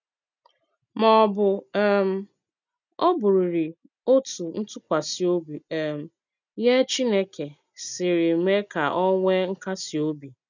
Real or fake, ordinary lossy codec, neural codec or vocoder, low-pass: real; none; none; 7.2 kHz